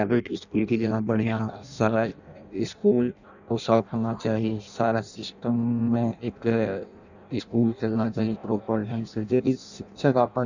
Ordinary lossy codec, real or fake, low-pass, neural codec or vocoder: none; fake; 7.2 kHz; codec, 16 kHz in and 24 kHz out, 0.6 kbps, FireRedTTS-2 codec